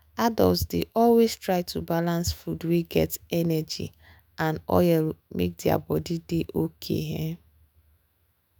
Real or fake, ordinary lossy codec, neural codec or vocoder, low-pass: fake; none; autoencoder, 48 kHz, 128 numbers a frame, DAC-VAE, trained on Japanese speech; none